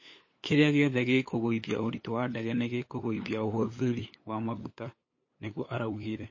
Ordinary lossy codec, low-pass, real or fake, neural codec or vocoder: MP3, 32 kbps; 7.2 kHz; fake; codec, 16 kHz, 8 kbps, FunCodec, trained on LibriTTS, 25 frames a second